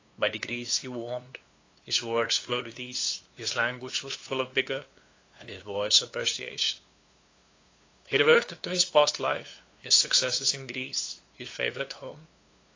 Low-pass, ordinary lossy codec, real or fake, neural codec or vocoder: 7.2 kHz; AAC, 32 kbps; fake; codec, 16 kHz, 2 kbps, FunCodec, trained on LibriTTS, 25 frames a second